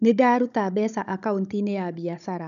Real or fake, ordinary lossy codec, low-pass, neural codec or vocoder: fake; none; 7.2 kHz; codec, 16 kHz, 16 kbps, FunCodec, trained on Chinese and English, 50 frames a second